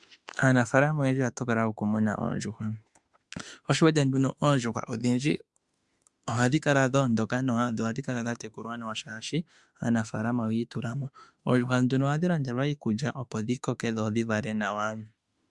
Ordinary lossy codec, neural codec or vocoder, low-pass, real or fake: Opus, 64 kbps; autoencoder, 48 kHz, 32 numbers a frame, DAC-VAE, trained on Japanese speech; 10.8 kHz; fake